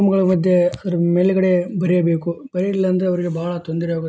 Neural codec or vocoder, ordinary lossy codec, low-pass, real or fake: none; none; none; real